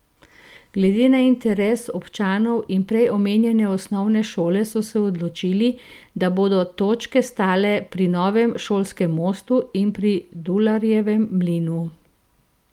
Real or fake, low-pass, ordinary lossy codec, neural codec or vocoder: real; 19.8 kHz; Opus, 32 kbps; none